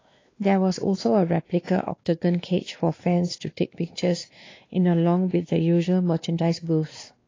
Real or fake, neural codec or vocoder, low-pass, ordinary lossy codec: fake; codec, 16 kHz, 2 kbps, X-Codec, WavLM features, trained on Multilingual LibriSpeech; 7.2 kHz; AAC, 32 kbps